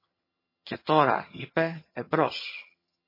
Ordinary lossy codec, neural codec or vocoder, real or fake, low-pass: MP3, 24 kbps; vocoder, 22.05 kHz, 80 mel bands, HiFi-GAN; fake; 5.4 kHz